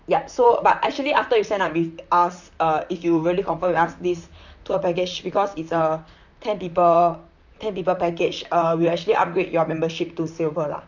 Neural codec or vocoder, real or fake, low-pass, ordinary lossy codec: vocoder, 44.1 kHz, 128 mel bands, Pupu-Vocoder; fake; 7.2 kHz; none